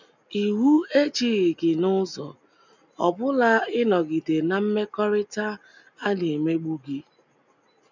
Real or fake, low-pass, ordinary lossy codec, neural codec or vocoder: real; 7.2 kHz; none; none